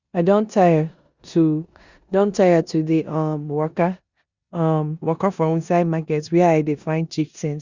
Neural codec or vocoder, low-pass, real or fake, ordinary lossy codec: codec, 16 kHz in and 24 kHz out, 0.9 kbps, LongCat-Audio-Codec, four codebook decoder; 7.2 kHz; fake; Opus, 64 kbps